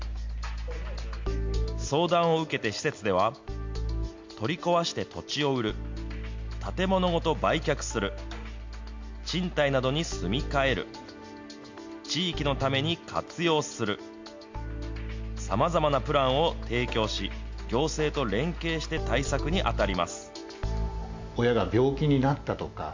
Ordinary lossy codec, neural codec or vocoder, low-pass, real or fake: MP3, 48 kbps; none; 7.2 kHz; real